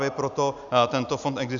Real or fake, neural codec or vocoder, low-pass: real; none; 7.2 kHz